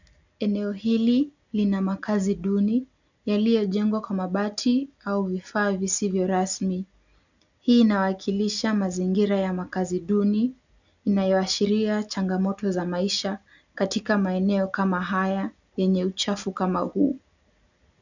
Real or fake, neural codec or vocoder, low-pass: real; none; 7.2 kHz